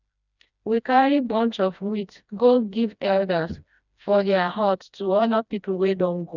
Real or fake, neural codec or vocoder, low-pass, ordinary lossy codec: fake; codec, 16 kHz, 1 kbps, FreqCodec, smaller model; 7.2 kHz; none